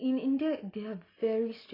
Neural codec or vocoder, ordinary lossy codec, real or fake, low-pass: none; AAC, 24 kbps; real; 5.4 kHz